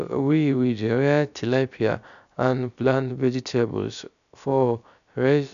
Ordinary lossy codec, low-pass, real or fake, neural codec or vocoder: none; 7.2 kHz; fake; codec, 16 kHz, about 1 kbps, DyCAST, with the encoder's durations